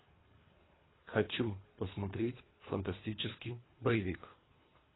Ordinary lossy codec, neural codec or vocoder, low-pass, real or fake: AAC, 16 kbps; codec, 24 kHz, 3 kbps, HILCodec; 7.2 kHz; fake